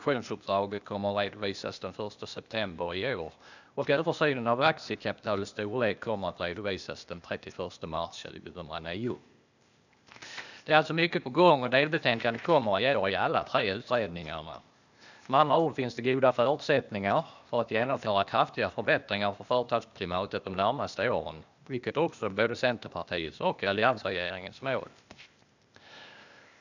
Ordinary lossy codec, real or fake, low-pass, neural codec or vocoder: none; fake; 7.2 kHz; codec, 16 kHz, 0.8 kbps, ZipCodec